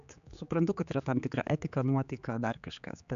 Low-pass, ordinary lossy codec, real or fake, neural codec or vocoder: 7.2 kHz; AAC, 96 kbps; fake; codec, 16 kHz, 4 kbps, X-Codec, HuBERT features, trained on general audio